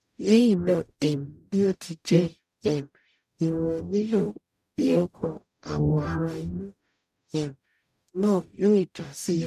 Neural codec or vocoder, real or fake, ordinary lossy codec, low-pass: codec, 44.1 kHz, 0.9 kbps, DAC; fake; none; 14.4 kHz